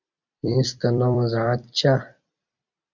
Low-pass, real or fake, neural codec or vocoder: 7.2 kHz; fake; vocoder, 44.1 kHz, 128 mel bands every 256 samples, BigVGAN v2